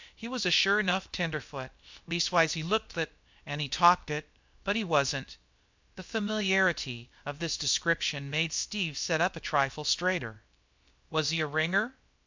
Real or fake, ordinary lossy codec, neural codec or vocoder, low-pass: fake; MP3, 64 kbps; codec, 16 kHz, about 1 kbps, DyCAST, with the encoder's durations; 7.2 kHz